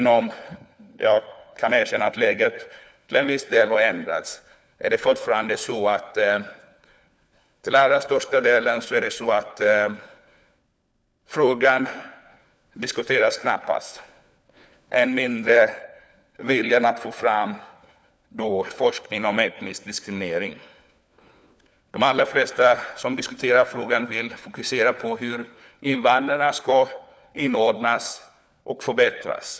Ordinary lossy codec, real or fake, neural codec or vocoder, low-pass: none; fake; codec, 16 kHz, 4 kbps, FunCodec, trained on LibriTTS, 50 frames a second; none